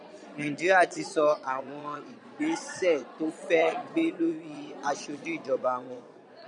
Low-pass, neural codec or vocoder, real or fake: 9.9 kHz; vocoder, 22.05 kHz, 80 mel bands, Vocos; fake